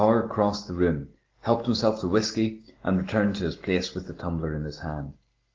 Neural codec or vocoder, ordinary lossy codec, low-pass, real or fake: none; Opus, 16 kbps; 7.2 kHz; real